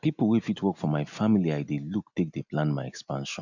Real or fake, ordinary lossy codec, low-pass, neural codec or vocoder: real; none; 7.2 kHz; none